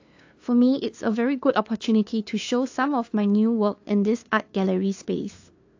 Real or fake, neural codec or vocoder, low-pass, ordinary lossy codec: fake; codec, 16 kHz, 2 kbps, FunCodec, trained on LibriTTS, 25 frames a second; 7.2 kHz; AAC, 48 kbps